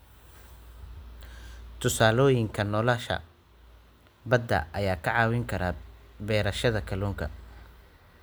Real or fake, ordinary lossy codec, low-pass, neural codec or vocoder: real; none; none; none